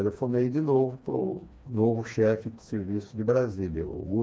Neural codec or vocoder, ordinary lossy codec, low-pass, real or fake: codec, 16 kHz, 2 kbps, FreqCodec, smaller model; none; none; fake